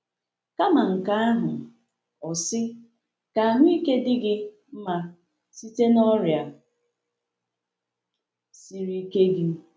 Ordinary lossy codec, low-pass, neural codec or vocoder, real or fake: none; none; none; real